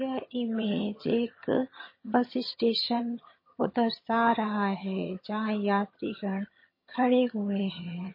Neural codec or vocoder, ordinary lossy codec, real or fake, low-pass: vocoder, 22.05 kHz, 80 mel bands, HiFi-GAN; MP3, 24 kbps; fake; 5.4 kHz